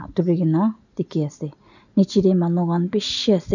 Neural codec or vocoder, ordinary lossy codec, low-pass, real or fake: none; none; 7.2 kHz; real